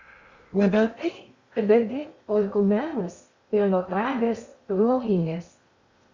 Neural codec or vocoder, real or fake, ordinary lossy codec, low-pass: codec, 16 kHz in and 24 kHz out, 0.6 kbps, FocalCodec, streaming, 2048 codes; fake; Opus, 64 kbps; 7.2 kHz